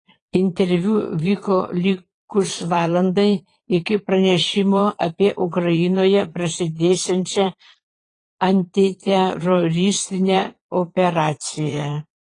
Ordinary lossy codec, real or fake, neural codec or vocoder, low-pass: AAC, 32 kbps; fake; vocoder, 22.05 kHz, 80 mel bands, Vocos; 9.9 kHz